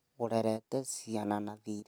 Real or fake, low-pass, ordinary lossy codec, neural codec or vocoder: real; none; none; none